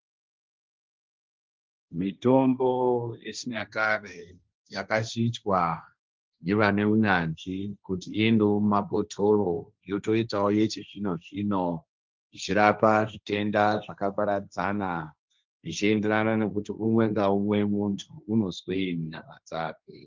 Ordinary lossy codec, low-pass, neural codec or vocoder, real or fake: Opus, 24 kbps; 7.2 kHz; codec, 16 kHz, 1.1 kbps, Voila-Tokenizer; fake